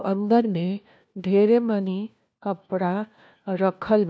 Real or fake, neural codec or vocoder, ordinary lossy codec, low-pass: fake; codec, 16 kHz, 1 kbps, FunCodec, trained on LibriTTS, 50 frames a second; none; none